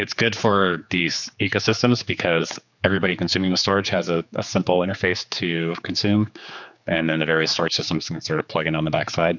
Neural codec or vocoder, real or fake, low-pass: codec, 16 kHz, 4 kbps, X-Codec, HuBERT features, trained on general audio; fake; 7.2 kHz